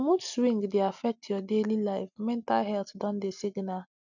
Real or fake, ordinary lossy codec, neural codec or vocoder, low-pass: real; none; none; 7.2 kHz